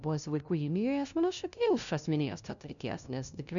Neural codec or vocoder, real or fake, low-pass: codec, 16 kHz, 0.5 kbps, FunCodec, trained on LibriTTS, 25 frames a second; fake; 7.2 kHz